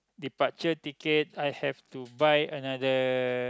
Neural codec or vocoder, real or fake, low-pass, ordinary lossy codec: none; real; none; none